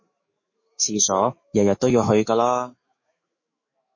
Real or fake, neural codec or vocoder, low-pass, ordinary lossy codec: fake; autoencoder, 48 kHz, 128 numbers a frame, DAC-VAE, trained on Japanese speech; 7.2 kHz; MP3, 32 kbps